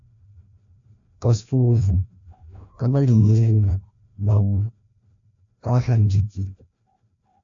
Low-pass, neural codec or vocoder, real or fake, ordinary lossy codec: 7.2 kHz; codec, 16 kHz, 1 kbps, FreqCodec, larger model; fake; AAC, 64 kbps